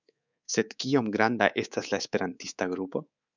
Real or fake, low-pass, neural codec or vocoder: fake; 7.2 kHz; codec, 24 kHz, 3.1 kbps, DualCodec